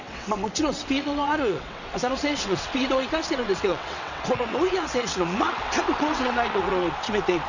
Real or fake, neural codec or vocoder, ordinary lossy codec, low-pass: fake; vocoder, 22.05 kHz, 80 mel bands, WaveNeXt; none; 7.2 kHz